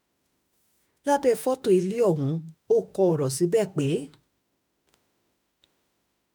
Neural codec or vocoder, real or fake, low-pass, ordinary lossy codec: autoencoder, 48 kHz, 32 numbers a frame, DAC-VAE, trained on Japanese speech; fake; none; none